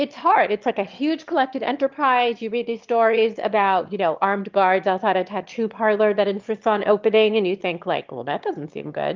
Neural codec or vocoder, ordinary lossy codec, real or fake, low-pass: autoencoder, 22.05 kHz, a latent of 192 numbers a frame, VITS, trained on one speaker; Opus, 32 kbps; fake; 7.2 kHz